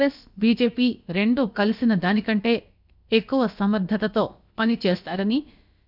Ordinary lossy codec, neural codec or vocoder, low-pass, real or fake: none; codec, 16 kHz, about 1 kbps, DyCAST, with the encoder's durations; 5.4 kHz; fake